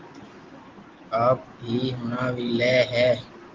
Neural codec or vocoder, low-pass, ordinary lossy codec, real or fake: none; 7.2 kHz; Opus, 16 kbps; real